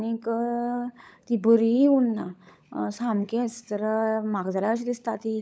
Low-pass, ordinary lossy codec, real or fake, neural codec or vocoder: none; none; fake; codec, 16 kHz, 16 kbps, FunCodec, trained on LibriTTS, 50 frames a second